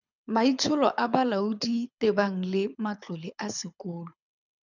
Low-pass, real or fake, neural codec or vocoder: 7.2 kHz; fake; codec, 24 kHz, 6 kbps, HILCodec